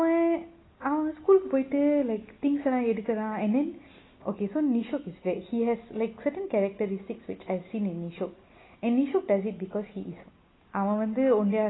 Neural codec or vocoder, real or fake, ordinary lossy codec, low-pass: none; real; AAC, 16 kbps; 7.2 kHz